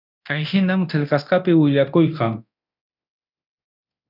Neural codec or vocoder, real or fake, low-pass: codec, 24 kHz, 0.9 kbps, DualCodec; fake; 5.4 kHz